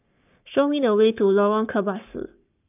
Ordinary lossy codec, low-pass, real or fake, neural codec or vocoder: none; 3.6 kHz; fake; codec, 44.1 kHz, 7.8 kbps, Pupu-Codec